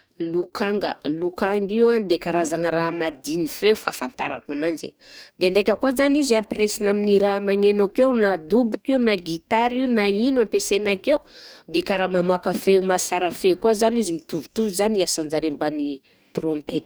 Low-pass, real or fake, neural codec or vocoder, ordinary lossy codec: none; fake; codec, 44.1 kHz, 2.6 kbps, DAC; none